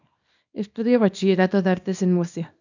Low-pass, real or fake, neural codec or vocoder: 7.2 kHz; fake; codec, 24 kHz, 0.9 kbps, WavTokenizer, small release